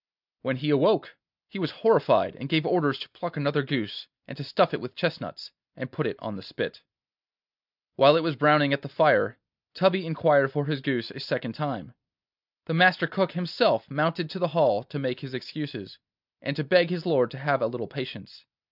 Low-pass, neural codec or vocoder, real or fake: 5.4 kHz; none; real